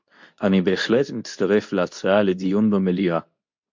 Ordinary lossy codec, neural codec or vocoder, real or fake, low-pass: MP3, 48 kbps; codec, 24 kHz, 0.9 kbps, WavTokenizer, medium speech release version 2; fake; 7.2 kHz